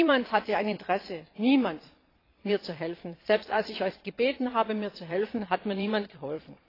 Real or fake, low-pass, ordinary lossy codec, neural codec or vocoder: fake; 5.4 kHz; AAC, 24 kbps; vocoder, 22.05 kHz, 80 mel bands, Vocos